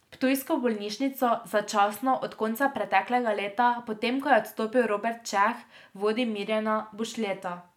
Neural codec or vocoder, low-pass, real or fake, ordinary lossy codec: none; 19.8 kHz; real; none